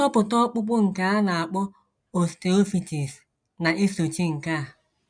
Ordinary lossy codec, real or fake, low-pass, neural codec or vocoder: none; real; 9.9 kHz; none